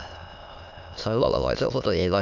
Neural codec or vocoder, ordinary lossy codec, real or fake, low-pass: autoencoder, 22.05 kHz, a latent of 192 numbers a frame, VITS, trained on many speakers; none; fake; 7.2 kHz